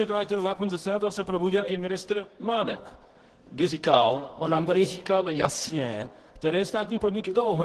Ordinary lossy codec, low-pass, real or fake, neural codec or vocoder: Opus, 16 kbps; 10.8 kHz; fake; codec, 24 kHz, 0.9 kbps, WavTokenizer, medium music audio release